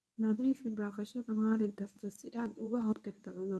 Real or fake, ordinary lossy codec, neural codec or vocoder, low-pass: fake; none; codec, 24 kHz, 0.9 kbps, WavTokenizer, medium speech release version 1; none